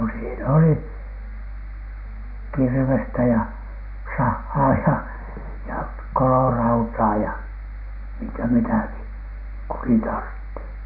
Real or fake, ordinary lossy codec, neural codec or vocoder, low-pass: real; none; none; 5.4 kHz